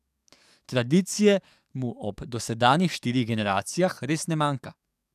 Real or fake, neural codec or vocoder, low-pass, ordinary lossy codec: fake; codec, 44.1 kHz, 7.8 kbps, DAC; 14.4 kHz; none